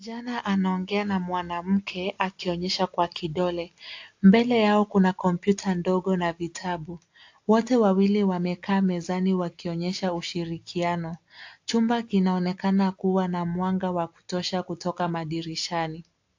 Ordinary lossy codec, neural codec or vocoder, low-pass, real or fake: AAC, 48 kbps; none; 7.2 kHz; real